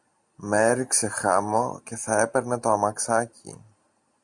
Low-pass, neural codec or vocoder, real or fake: 10.8 kHz; none; real